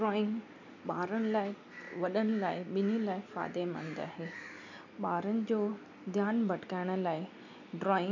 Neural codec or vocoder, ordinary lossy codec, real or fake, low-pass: none; none; real; 7.2 kHz